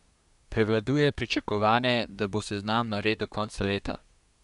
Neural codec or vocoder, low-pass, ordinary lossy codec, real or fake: codec, 24 kHz, 1 kbps, SNAC; 10.8 kHz; none; fake